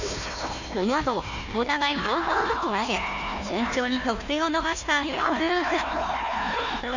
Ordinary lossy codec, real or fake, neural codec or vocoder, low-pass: none; fake; codec, 16 kHz, 1 kbps, FunCodec, trained on Chinese and English, 50 frames a second; 7.2 kHz